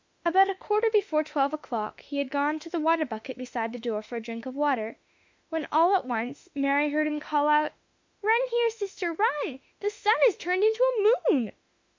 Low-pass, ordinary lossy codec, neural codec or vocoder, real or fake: 7.2 kHz; MP3, 64 kbps; autoencoder, 48 kHz, 32 numbers a frame, DAC-VAE, trained on Japanese speech; fake